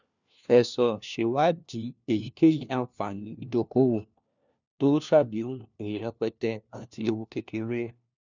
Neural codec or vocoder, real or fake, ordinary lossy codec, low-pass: codec, 16 kHz, 1 kbps, FunCodec, trained on LibriTTS, 50 frames a second; fake; none; 7.2 kHz